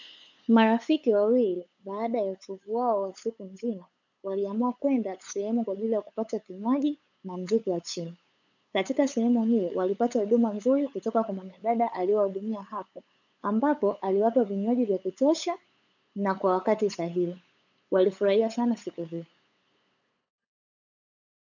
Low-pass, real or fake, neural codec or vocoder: 7.2 kHz; fake; codec, 16 kHz, 8 kbps, FunCodec, trained on LibriTTS, 25 frames a second